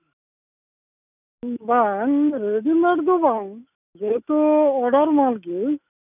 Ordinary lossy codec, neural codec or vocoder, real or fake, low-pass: none; none; real; 3.6 kHz